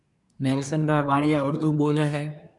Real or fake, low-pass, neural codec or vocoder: fake; 10.8 kHz; codec, 24 kHz, 1 kbps, SNAC